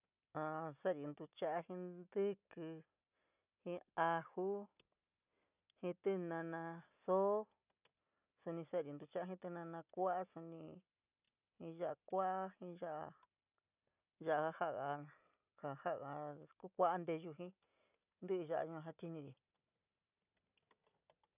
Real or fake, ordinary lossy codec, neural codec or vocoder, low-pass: real; none; none; 3.6 kHz